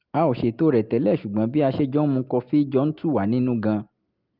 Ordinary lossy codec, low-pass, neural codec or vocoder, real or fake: Opus, 32 kbps; 5.4 kHz; none; real